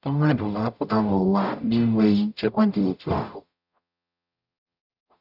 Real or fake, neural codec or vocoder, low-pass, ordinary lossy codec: fake; codec, 44.1 kHz, 0.9 kbps, DAC; 5.4 kHz; none